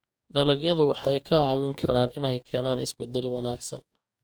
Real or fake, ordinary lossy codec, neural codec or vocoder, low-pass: fake; none; codec, 44.1 kHz, 2.6 kbps, DAC; none